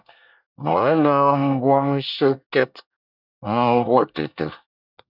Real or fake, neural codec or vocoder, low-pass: fake; codec, 24 kHz, 1 kbps, SNAC; 5.4 kHz